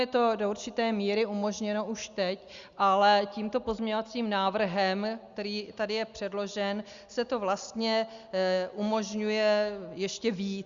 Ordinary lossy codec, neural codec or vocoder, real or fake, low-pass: Opus, 64 kbps; none; real; 7.2 kHz